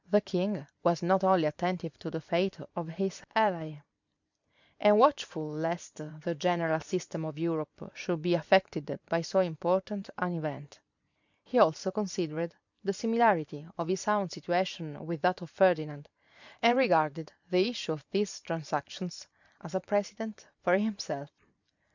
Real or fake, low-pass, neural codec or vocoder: fake; 7.2 kHz; vocoder, 44.1 kHz, 128 mel bands every 512 samples, BigVGAN v2